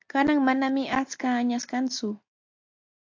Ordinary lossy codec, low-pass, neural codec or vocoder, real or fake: AAC, 48 kbps; 7.2 kHz; none; real